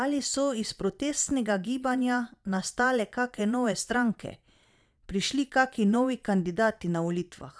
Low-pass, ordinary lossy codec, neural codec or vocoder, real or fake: none; none; vocoder, 22.05 kHz, 80 mel bands, Vocos; fake